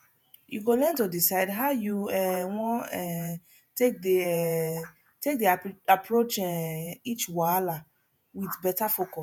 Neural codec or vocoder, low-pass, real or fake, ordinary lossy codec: vocoder, 48 kHz, 128 mel bands, Vocos; none; fake; none